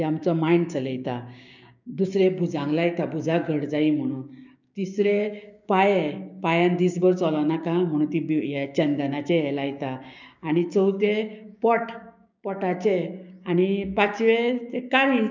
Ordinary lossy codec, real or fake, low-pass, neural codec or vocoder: none; fake; 7.2 kHz; codec, 16 kHz, 6 kbps, DAC